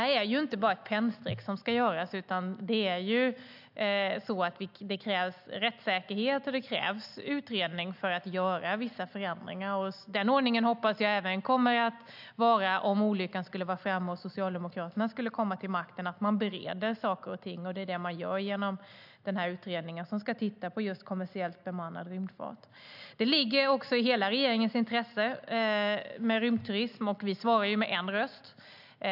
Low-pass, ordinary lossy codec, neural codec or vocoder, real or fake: 5.4 kHz; AAC, 48 kbps; none; real